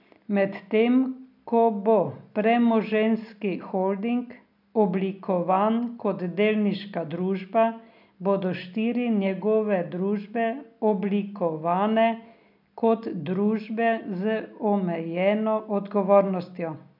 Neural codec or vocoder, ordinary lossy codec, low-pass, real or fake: none; none; 5.4 kHz; real